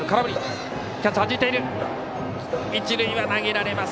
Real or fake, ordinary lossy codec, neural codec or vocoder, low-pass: real; none; none; none